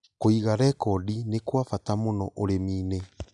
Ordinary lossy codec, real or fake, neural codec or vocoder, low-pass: none; real; none; 10.8 kHz